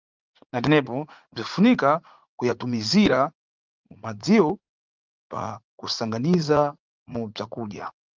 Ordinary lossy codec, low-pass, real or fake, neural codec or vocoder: Opus, 24 kbps; 7.2 kHz; fake; vocoder, 44.1 kHz, 80 mel bands, Vocos